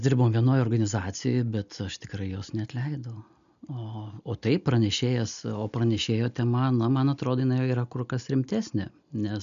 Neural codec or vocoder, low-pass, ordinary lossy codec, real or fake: none; 7.2 kHz; MP3, 96 kbps; real